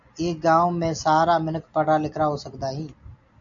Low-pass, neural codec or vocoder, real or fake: 7.2 kHz; none; real